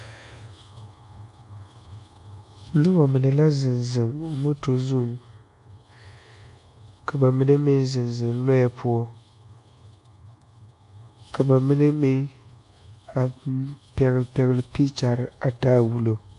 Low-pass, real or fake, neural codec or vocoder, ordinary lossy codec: 10.8 kHz; fake; codec, 24 kHz, 1.2 kbps, DualCodec; MP3, 64 kbps